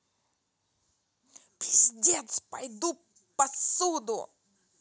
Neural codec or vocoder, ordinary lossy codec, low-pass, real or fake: none; none; none; real